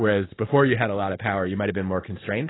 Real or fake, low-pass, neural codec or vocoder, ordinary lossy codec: real; 7.2 kHz; none; AAC, 16 kbps